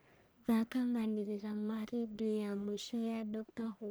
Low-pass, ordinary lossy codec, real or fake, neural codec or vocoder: none; none; fake; codec, 44.1 kHz, 1.7 kbps, Pupu-Codec